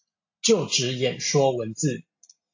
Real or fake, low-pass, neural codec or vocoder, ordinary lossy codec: real; 7.2 kHz; none; AAC, 48 kbps